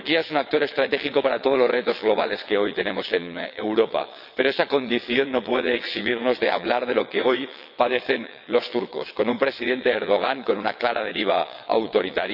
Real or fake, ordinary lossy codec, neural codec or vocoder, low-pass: fake; none; vocoder, 22.05 kHz, 80 mel bands, WaveNeXt; 5.4 kHz